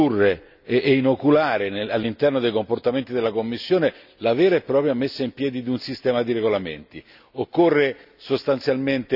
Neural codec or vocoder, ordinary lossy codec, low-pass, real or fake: none; none; 5.4 kHz; real